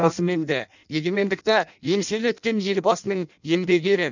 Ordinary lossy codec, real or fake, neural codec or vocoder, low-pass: none; fake; codec, 16 kHz in and 24 kHz out, 0.6 kbps, FireRedTTS-2 codec; 7.2 kHz